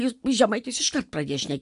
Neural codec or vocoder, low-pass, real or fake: none; 10.8 kHz; real